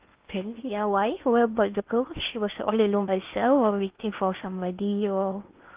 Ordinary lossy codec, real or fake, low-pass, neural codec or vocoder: Opus, 32 kbps; fake; 3.6 kHz; codec, 16 kHz in and 24 kHz out, 0.8 kbps, FocalCodec, streaming, 65536 codes